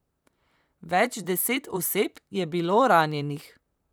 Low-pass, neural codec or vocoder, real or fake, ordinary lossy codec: none; vocoder, 44.1 kHz, 128 mel bands, Pupu-Vocoder; fake; none